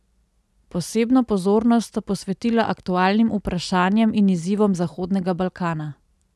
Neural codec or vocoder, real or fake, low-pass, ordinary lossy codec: none; real; none; none